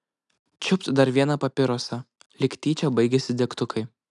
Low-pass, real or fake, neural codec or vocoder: 10.8 kHz; real; none